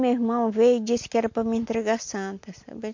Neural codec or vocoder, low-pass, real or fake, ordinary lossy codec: none; 7.2 kHz; real; MP3, 48 kbps